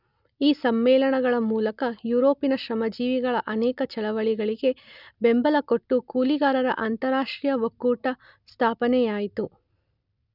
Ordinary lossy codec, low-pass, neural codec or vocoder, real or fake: none; 5.4 kHz; none; real